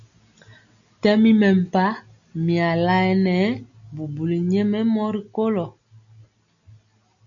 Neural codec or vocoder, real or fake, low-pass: none; real; 7.2 kHz